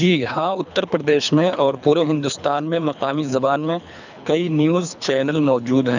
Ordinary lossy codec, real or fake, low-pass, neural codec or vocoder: none; fake; 7.2 kHz; codec, 24 kHz, 3 kbps, HILCodec